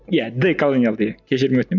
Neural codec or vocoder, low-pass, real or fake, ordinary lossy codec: none; 7.2 kHz; real; none